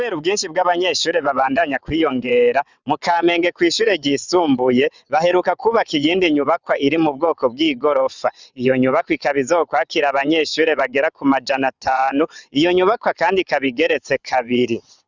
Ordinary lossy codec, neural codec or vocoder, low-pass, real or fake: Opus, 64 kbps; vocoder, 22.05 kHz, 80 mel bands, WaveNeXt; 7.2 kHz; fake